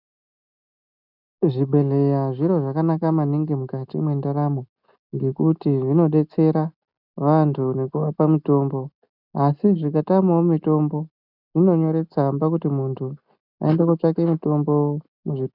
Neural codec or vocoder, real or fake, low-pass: none; real; 5.4 kHz